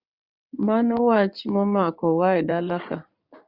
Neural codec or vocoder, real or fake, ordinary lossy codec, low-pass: codec, 16 kHz in and 24 kHz out, 2.2 kbps, FireRedTTS-2 codec; fake; Opus, 64 kbps; 5.4 kHz